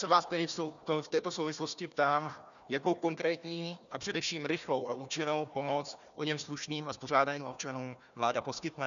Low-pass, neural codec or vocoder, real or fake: 7.2 kHz; codec, 16 kHz, 1 kbps, FunCodec, trained on Chinese and English, 50 frames a second; fake